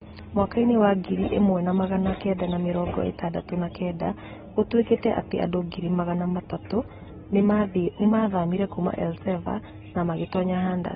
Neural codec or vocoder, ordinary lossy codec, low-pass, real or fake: none; AAC, 16 kbps; 19.8 kHz; real